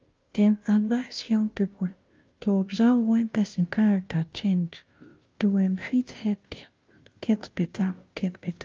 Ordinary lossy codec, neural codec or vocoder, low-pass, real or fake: Opus, 24 kbps; codec, 16 kHz, 0.5 kbps, FunCodec, trained on Chinese and English, 25 frames a second; 7.2 kHz; fake